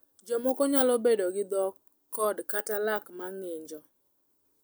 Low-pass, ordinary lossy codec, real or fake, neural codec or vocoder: none; none; real; none